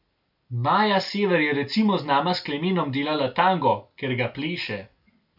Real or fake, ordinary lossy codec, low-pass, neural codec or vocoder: real; none; 5.4 kHz; none